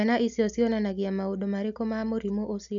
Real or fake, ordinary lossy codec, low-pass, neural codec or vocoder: real; none; 7.2 kHz; none